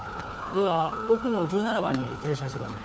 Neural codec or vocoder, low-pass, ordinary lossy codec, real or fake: codec, 16 kHz, 4 kbps, FunCodec, trained on LibriTTS, 50 frames a second; none; none; fake